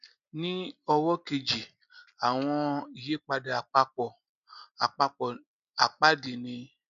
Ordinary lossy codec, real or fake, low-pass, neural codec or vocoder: none; real; 7.2 kHz; none